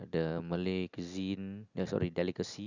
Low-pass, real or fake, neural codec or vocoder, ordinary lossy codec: 7.2 kHz; real; none; none